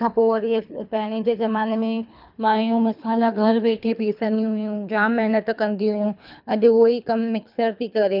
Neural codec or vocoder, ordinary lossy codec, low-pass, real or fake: codec, 24 kHz, 3 kbps, HILCodec; none; 5.4 kHz; fake